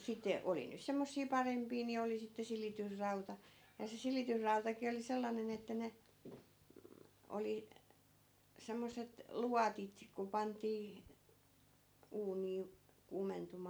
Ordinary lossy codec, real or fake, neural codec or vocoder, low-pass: none; real; none; none